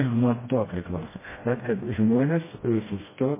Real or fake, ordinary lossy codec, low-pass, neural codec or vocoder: fake; MP3, 16 kbps; 3.6 kHz; codec, 16 kHz, 1 kbps, FreqCodec, smaller model